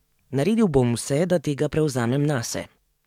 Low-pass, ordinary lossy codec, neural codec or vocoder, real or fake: 19.8 kHz; MP3, 96 kbps; codec, 44.1 kHz, 7.8 kbps, DAC; fake